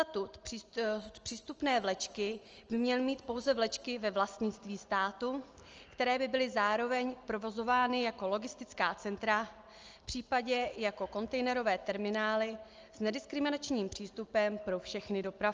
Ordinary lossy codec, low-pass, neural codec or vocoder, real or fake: Opus, 24 kbps; 7.2 kHz; none; real